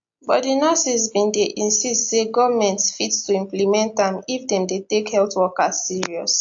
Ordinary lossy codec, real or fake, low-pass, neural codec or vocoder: AAC, 64 kbps; real; 7.2 kHz; none